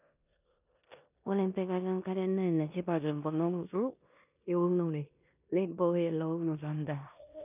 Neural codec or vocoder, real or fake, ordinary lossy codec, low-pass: codec, 16 kHz in and 24 kHz out, 0.9 kbps, LongCat-Audio-Codec, four codebook decoder; fake; none; 3.6 kHz